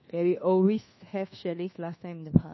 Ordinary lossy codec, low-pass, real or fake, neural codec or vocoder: MP3, 24 kbps; 7.2 kHz; fake; codec, 24 kHz, 1.2 kbps, DualCodec